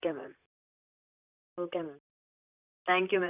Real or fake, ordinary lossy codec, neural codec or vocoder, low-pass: fake; none; vocoder, 44.1 kHz, 128 mel bands, Pupu-Vocoder; 3.6 kHz